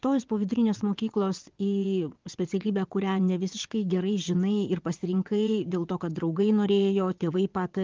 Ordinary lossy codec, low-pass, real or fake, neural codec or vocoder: Opus, 32 kbps; 7.2 kHz; fake; vocoder, 22.05 kHz, 80 mel bands, WaveNeXt